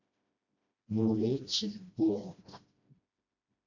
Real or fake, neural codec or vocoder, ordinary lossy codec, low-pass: fake; codec, 16 kHz, 1 kbps, FreqCodec, smaller model; AAC, 48 kbps; 7.2 kHz